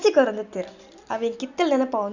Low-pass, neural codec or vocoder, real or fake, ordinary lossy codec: 7.2 kHz; none; real; none